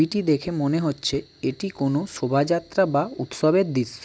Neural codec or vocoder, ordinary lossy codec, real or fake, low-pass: none; none; real; none